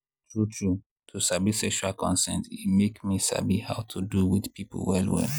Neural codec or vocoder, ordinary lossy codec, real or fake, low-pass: none; none; real; none